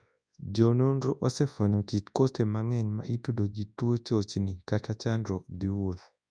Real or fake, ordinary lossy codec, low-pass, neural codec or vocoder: fake; none; 9.9 kHz; codec, 24 kHz, 0.9 kbps, WavTokenizer, large speech release